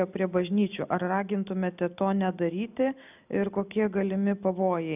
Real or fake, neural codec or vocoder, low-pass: real; none; 3.6 kHz